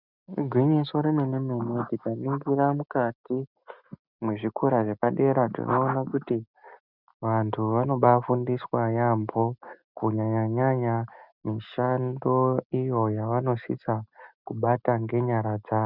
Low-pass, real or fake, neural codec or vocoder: 5.4 kHz; real; none